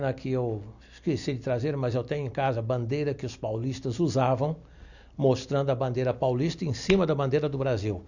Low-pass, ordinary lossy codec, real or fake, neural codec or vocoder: 7.2 kHz; none; real; none